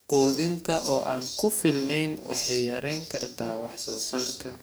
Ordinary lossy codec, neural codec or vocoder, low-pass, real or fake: none; codec, 44.1 kHz, 2.6 kbps, DAC; none; fake